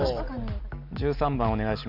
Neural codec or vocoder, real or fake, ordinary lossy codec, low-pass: none; real; none; 5.4 kHz